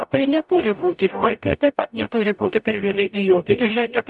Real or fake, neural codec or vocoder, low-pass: fake; codec, 44.1 kHz, 0.9 kbps, DAC; 10.8 kHz